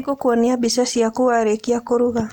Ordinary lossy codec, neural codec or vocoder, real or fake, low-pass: none; none; real; 19.8 kHz